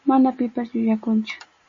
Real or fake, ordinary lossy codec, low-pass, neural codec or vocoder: real; MP3, 32 kbps; 7.2 kHz; none